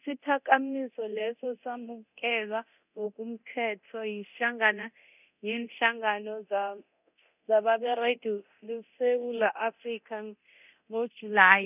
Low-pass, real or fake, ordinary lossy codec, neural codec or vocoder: 3.6 kHz; fake; none; codec, 24 kHz, 0.9 kbps, DualCodec